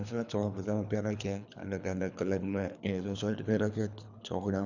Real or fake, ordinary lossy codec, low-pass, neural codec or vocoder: fake; none; 7.2 kHz; codec, 24 kHz, 3 kbps, HILCodec